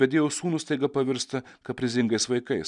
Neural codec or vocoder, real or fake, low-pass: none; real; 10.8 kHz